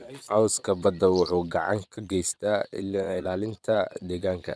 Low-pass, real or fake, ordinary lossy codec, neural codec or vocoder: none; fake; none; vocoder, 22.05 kHz, 80 mel bands, Vocos